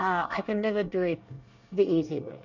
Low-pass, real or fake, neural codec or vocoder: 7.2 kHz; fake; codec, 24 kHz, 1 kbps, SNAC